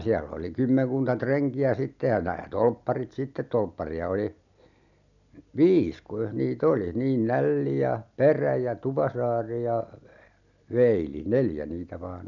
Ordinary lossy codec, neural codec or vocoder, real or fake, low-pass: none; none; real; 7.2 kHz